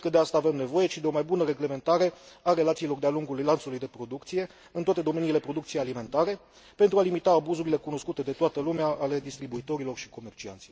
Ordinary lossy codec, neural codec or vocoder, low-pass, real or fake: none; none; none; real